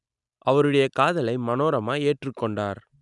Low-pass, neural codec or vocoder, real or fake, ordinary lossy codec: 10.8 kHz; none; real; none